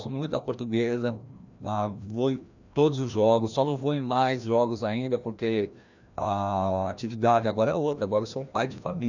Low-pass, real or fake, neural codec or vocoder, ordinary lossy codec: 7.2 kHz; fake; codec, 16 kHz, 1 kbps, FreqCodec, larger model; none